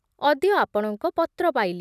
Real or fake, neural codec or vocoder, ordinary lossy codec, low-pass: real; none; none; 14.4 kHz